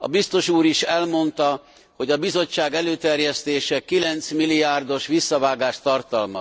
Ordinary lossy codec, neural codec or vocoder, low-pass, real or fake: none; none; none; real